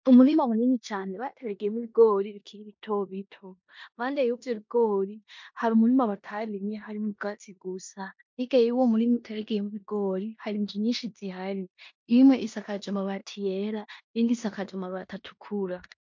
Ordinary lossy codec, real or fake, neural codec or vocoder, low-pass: MP3, 48 kbps; fake; codec, 16 kHz in and 24 kHz out, 0.9 kbps, LongCat-Audio-Codec, four codebook decoder; 7.2 kHz